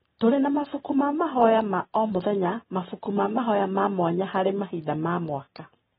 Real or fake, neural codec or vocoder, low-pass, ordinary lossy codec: real; none; 19.8 kHz; AAC, 16 kbps